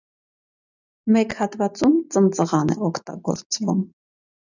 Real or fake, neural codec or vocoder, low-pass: real; none; 7.2 kHz